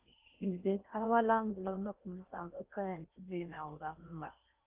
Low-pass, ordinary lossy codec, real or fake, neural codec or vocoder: 3.6 kHz; Opus, 32 kbps; fake; codec, 16 kHz in and 24 kHz out, 0.6 kbps, FocalCodec, streaming, 4096 codes